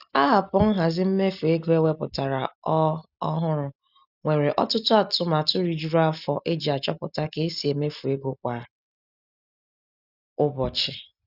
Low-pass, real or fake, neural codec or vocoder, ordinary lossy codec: 5.4 kHz; real; none; none